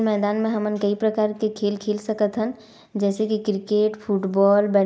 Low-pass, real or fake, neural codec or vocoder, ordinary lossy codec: none; real; none; none